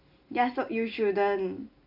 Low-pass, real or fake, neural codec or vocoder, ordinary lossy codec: 5.4 kHz; real; none; none